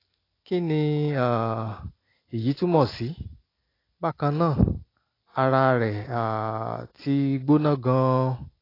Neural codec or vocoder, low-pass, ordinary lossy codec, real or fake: none; 5.4 kHz; AAC, 24 kbps; real